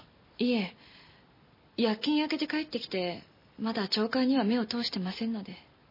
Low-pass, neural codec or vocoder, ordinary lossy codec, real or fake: 5.4 kHz; none; MP3, 24 kbps; real